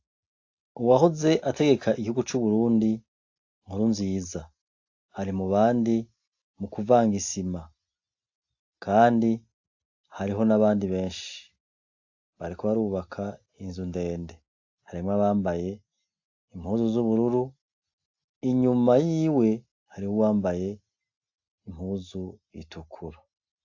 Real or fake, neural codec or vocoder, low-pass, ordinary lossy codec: real; none; 7.2 kHz; AAC, 48 kbps